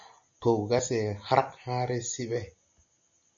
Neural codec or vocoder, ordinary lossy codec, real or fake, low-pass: none; MP3, 64 kbps; real; 7.2 kHz